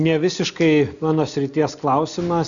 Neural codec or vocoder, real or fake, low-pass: none; real; 7.2 kHz